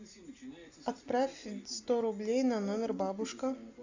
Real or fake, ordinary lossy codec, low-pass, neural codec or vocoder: real; AAC, 48 kbps; 7.2 kHz; none